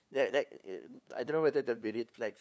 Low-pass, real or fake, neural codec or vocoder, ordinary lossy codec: none; fake; codec, 16 kHz, 2 kbps, FunCodec, trained on LibriTTS, 25 frames a second; none